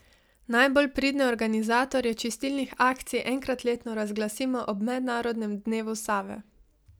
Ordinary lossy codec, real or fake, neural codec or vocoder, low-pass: none; real; none; none